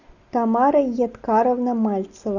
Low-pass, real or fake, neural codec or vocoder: 7.2 kHz; real; none